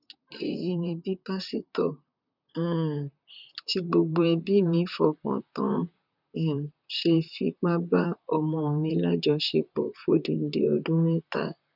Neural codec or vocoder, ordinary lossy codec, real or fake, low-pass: vocoder, 44.1 kHz, 128 mel bands, Pupu-Vocoder; none; fake; 5.4 kHz